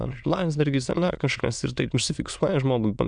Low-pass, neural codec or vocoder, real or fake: 9.9 kHz; autoencoder, 22.05 kHz, a latent of 192 numbers a frame, VITS, trained on many speakers; fake